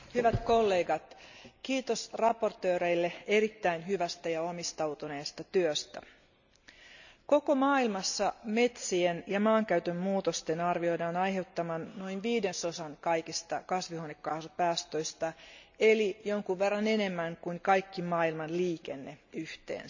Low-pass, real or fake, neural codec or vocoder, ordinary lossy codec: 7.2 kHz; real; none; none